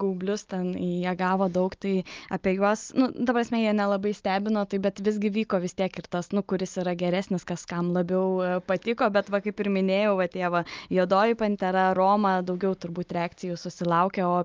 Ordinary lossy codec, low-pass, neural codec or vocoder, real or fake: Opus, 24 kbps; 7.2 kHz; none; real